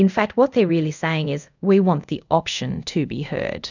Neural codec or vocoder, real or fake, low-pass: codec, 24 kHz, 0.5 kbps, DualCodec; fake; 7.2 kHz